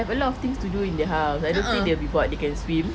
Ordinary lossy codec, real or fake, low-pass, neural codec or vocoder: none; real; none; none